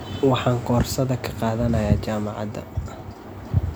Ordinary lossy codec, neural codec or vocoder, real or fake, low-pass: none; none; real; none